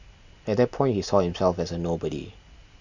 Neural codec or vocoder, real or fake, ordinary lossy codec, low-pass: none; real; none; 7.2 kHz